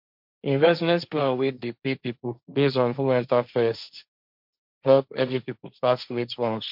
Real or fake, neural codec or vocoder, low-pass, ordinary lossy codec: fake; codec, 16 kHz, 1.1 kbps, Voila-Tokenizer; 5.4 kHz; MP3, 48 kbps